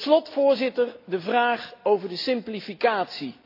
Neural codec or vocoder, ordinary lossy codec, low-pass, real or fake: none; AAC, 32 kbps; 5.4 kHz; real